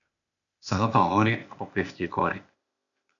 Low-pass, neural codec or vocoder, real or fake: 7.2 kHz; codec, 16 kHz, 0.8 kbps, ZipCodec; fake